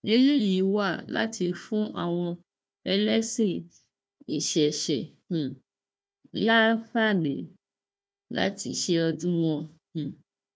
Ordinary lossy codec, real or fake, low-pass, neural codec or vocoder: none; fake; none; codec, 16 kHz, 1 kbps, FunCodec, trained on Chinese and English, 50 frames a second